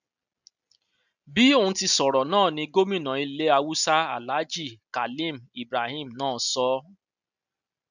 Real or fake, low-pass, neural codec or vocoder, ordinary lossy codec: real; 7.2 kHz; none; none